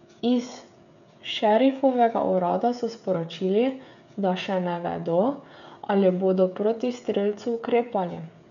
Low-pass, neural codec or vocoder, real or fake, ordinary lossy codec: 7.2 kHz; codec, 16 kHz, 16 kbps, FreqCodec, smaller model; fake; none